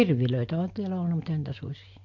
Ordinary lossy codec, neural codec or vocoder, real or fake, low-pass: MP3, 48 kbps; none; real; 7.2 kHz